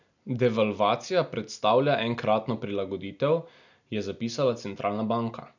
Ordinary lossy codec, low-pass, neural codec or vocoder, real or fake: none; 7.2 kHz; none; real